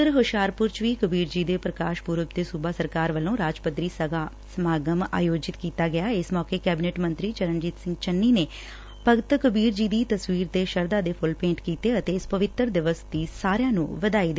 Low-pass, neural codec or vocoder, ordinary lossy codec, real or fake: none; none; none; real